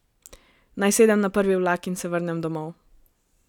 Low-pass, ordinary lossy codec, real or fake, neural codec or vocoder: 19.8 kHz; none; real; none